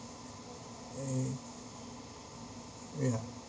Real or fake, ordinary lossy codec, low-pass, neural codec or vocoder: real; none; none; none